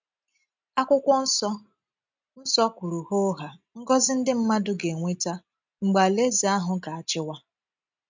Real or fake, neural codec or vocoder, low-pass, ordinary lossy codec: real; none; 7.2 kHz; MP3, 64 kbps